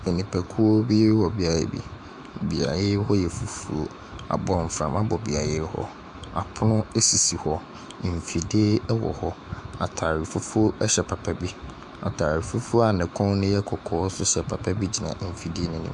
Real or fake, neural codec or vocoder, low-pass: fake; autoencoder, 48 kHz, 128 numbers a frame, DAC-VAE, trained on Japanese speech; 10.8 kHz